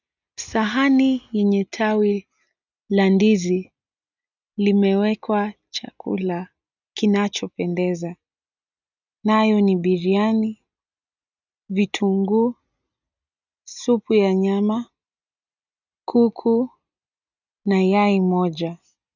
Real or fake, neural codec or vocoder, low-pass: real; none; 7.2 kHz